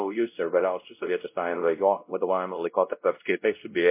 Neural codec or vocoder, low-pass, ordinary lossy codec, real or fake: codec, 16 kHz, 0.5 kbps, X-Codec, WavLM features, trained on Multilingual LibriSpeech; 3.6 kHz; MP3, 24 kbps; fake